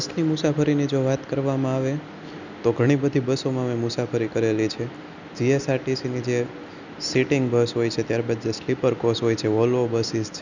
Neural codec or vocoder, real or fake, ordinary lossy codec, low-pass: none; real; none; 7.2 kHz